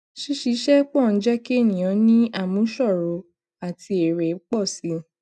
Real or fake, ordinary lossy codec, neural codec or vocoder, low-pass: real; none; none; none